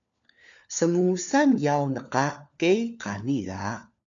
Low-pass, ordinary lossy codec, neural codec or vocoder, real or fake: 7.2 kHz; MP3, 64 kbps; codec, 16 kHz, 4 kbps, FunCodec, trained on LibriTTS, 50 frames a second; fake